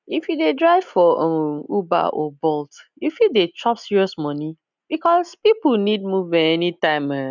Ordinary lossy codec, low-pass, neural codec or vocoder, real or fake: none; 7.2 kHz; none; real